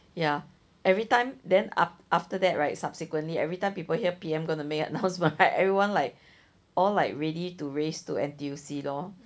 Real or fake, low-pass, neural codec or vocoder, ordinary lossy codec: real; none; none; none